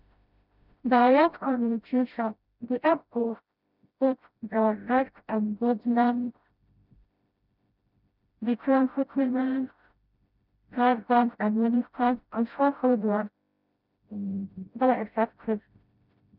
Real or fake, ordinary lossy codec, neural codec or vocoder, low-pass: fake; none; codec, 16 kHz, 0.5 kbps, FreqCodec, smaller model; 5.4 kHz